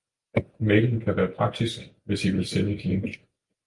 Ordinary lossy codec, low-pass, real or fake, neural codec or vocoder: Opus, 32 kbps; 10.8 kHz; real; none